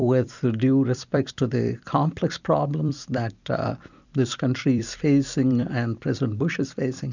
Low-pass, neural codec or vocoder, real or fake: 7.2 kHz; vocoder, 44.1 kHz, 128 mel bands every 256 samples, BigVGAN v2; fake